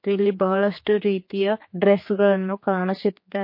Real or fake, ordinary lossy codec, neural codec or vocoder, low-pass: fake; MP3, 32 kbps; codec, 16 kHz, 4 kbps, X-Codec, HuBERT features, trained on general audio; 5.4 kHz